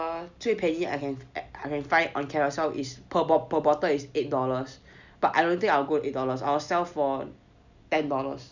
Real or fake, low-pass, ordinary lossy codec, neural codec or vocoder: real; 7.2 kHz; none; none